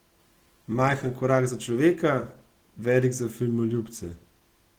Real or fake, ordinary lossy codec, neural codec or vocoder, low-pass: real; Opus, 16 kbps; none; 19.8 kHz